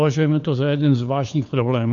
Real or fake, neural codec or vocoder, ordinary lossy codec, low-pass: fake; codec, 16 kHz, 4 kbps, FunCodec, trained on LibriTTS, 50 frames a second; AAC, 64 kbps; 7.2 kHz